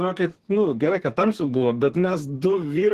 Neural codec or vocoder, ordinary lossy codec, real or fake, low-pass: codec, 32 kHz, 1.9 kbps, SNAC; Opus, 16 kbps; fake; 14.4 kHz